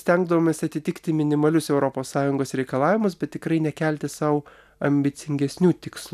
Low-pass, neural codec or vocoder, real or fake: 14.4 kHz; none; real